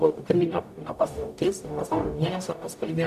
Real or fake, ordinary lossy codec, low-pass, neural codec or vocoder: fake; AAC, 96 kbps; 14.4 kHz; codec, 44.1 kHz, 0.9 kbps, DAC